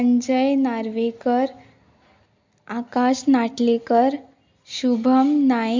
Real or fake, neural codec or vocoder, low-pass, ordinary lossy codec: real; none; 7.2 kHz; MP3, 64 kbps